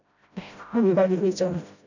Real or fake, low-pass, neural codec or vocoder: fake; 7.2 kHz; codec, 16 kHz, 0.5 kbps, FreqCodec, smaller model